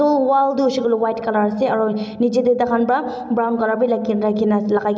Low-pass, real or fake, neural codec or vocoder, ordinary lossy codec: none; real; none; none